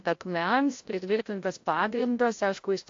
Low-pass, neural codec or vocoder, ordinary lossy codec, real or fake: 7.2 kHz; codec, 16 kHz, 0.5 kbps, FreqCodec, larger model; AAC, 48 kbps; fake